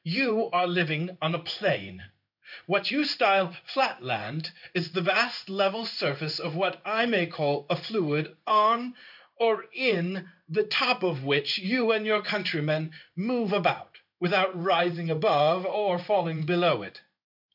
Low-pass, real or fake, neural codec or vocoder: 5.4 kHz; fake; codec, 16 kHz in and 24 kHz out, 1 kbps, XY-Tokenizer